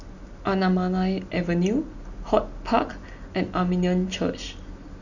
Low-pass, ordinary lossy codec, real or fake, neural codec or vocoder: 7.2 kHz; none; real; none